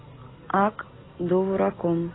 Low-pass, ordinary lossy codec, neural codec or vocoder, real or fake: 7.2 kHz; AAC, 16 kbps; none; real